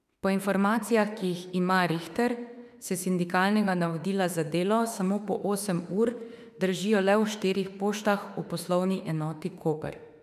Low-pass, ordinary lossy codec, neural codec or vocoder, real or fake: 14.4 kHz; none; autoencoder, 48 kHz, 32 numbers a frame, DAC-VAE, trained on Japanese speech; fake